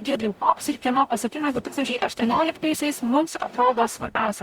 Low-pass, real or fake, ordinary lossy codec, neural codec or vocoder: 19.8 kHz; fake; Opus, 64 kbps; codec, 44.1 kHz, 0.9 kbps, DAC